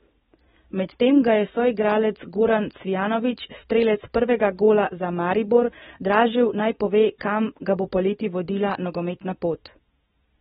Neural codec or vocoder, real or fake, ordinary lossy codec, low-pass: none; real; AAC, 16 kbps; 19.8 kHz